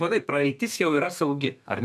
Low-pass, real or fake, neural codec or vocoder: 14.4 kHz; fake; codec, 32 kHz, 1.9 kbps, SNAC